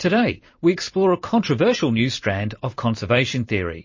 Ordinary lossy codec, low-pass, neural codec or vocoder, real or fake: MP3, 32 kbps; 7.2 kHz; vocoder, 44.1 kHz, 128 mel bands every 256 samples, BigVGAN v2; fake